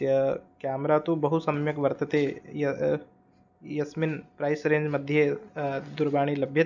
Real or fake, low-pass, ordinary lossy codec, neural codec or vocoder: real; 7.2 kHz; none; none